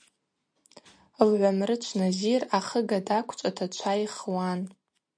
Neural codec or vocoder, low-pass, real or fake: none; 9.9 kHz; real